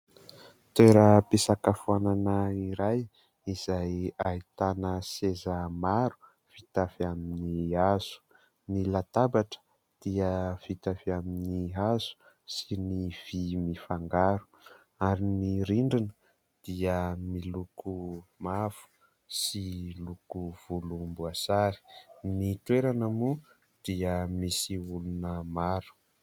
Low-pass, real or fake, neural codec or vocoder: 19.8 kHz; real; none